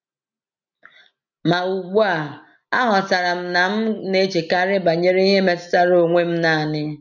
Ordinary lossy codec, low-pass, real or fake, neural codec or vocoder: none; 7.2 kHz; real; none